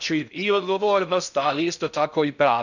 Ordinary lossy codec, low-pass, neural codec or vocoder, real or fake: none; 7.2 kHz; codec, 16 kHz in and 24 kHz out, 0.6 kbps, FocalCodec, streaming, 2048 codes; fake